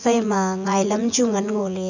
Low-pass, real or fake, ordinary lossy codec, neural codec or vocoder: 7.2 kHz; fake; none; vocoder, 24 kHz, 100 mel bands, Vocos